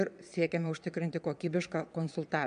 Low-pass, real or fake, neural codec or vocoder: 9.9 kHz; fake; vocoder, 22.05 kHz, 80 mel bands, Vocos